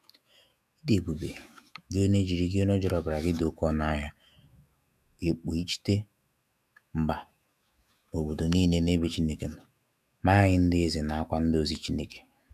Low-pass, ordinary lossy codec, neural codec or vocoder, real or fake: 14.4 kHz; none; autoencoder, 48 kHz, 128 numbers a frame, DAC-VAE, trained on Japanese speech; fake